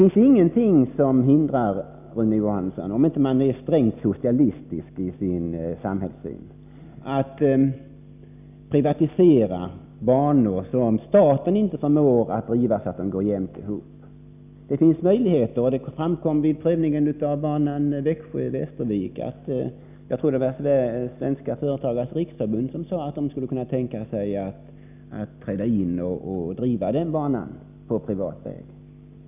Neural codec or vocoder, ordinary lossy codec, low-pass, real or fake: none; none; 3.6 kHz; real